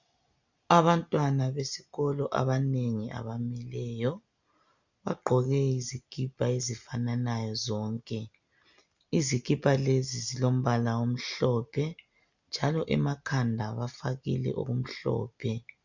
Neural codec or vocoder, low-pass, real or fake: none; 7.2 kHz; real